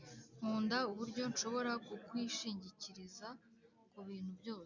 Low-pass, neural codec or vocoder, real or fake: 7.2 kHz; none; real